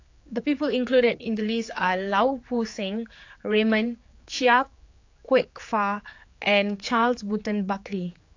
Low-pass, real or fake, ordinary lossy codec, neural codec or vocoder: 7.2 kHz; fake; AAC, 48 kbps; codec, 16 kHz, 4 kbps, X-Codec, HuBERT features, trained on general audio